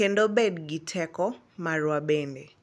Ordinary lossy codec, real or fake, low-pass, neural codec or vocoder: none; real; none; none